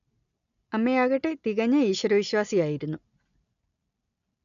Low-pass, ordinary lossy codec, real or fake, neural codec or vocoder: 7.2 kHz; none; real; none